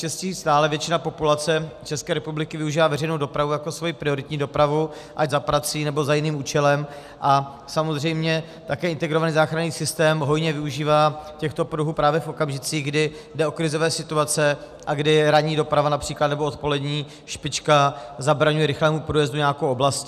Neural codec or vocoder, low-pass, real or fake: none; 14.4 kHz; real